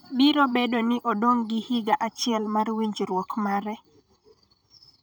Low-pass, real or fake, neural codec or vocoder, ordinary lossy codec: none; fake; vocoder, 44.1 kHz, 128 mel bands, Pupu-Vocoder; none